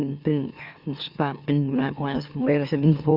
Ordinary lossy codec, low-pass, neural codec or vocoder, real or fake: Opus, 64 kbps; 5.4 kHz; autoencoder, 44.1 kHz, a latent of 192 numbers a frame, MeloTTS; fake